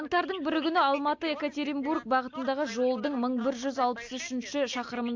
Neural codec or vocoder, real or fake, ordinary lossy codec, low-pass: none; real; AAC, 48 kbps; 7.2 kHz